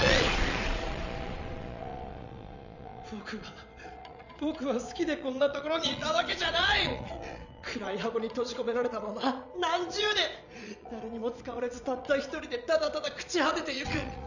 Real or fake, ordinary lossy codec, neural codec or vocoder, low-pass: fake; none; vocoder, 22.05 kHz, 80 mel bands, Vocos; 7.2 kHz